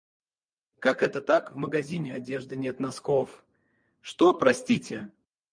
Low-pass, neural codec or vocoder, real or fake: 9.9 kHz; none; real